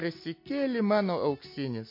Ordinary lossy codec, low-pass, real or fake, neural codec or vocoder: MP3, 32 kbps; 5.4 kHz; real; none